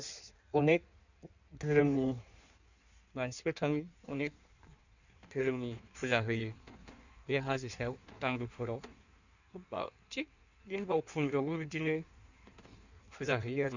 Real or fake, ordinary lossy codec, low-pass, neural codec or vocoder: fake; none; 7.2 kHz; codec, 16 kHz in and 24 kHz out, 1.1 kbps, FireRedTTS-2 codec